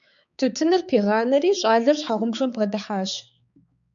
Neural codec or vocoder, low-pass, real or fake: codec, 16 kHz, 4 kbps, X-Codec, HuBERT features, trained on balanced general audio; 7.2 kHz; fake